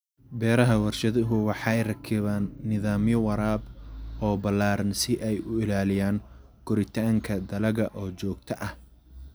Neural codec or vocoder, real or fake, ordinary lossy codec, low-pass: none; real; none; none